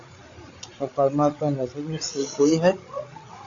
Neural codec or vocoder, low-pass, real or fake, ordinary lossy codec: codec, 16 kHz, 8 kbps, FreqCodec, larger model; 7.2 kHz; fake; AAC, 48 kbps